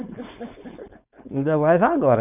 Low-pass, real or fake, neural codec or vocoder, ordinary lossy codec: 3.6 kHz; fake; codec, 16 kHz, 4.8 kbps, FACodec; none